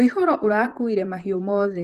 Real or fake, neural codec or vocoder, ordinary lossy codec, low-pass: fake; vocoder, 44.1 kHz, 128 mel bands, Pupu-Vocoder; Opus, 16 kbps; 14.4 kHz